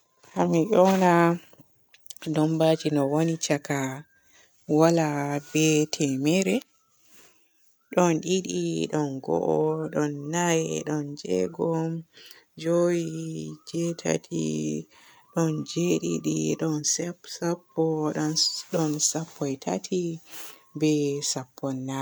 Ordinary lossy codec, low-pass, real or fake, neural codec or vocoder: none; none; real; none